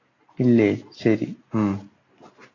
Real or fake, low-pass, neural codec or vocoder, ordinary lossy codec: real; 7.2 kHz; none; AAC, 32 kbps